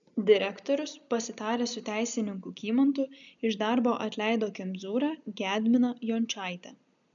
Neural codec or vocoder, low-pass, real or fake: none; 7.2 kHz; real